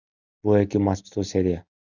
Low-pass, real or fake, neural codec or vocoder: 7.2 kHz; real; none